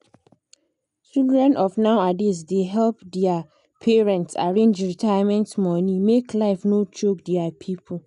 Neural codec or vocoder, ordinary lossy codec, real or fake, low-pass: none; none; real; 10.8 kHz